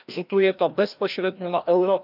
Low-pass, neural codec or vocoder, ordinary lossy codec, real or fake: 5.4 kHz; codec, 16 kHz, 1 kbps, FreqCodec, larger model; none; fake